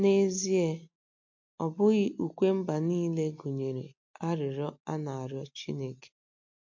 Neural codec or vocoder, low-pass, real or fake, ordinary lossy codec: none; 7.2 kHz; real; MP3, 48 kbps